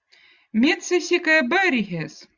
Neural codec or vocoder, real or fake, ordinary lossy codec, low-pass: none; real; Opus, 64 kbps; 7.2 kHz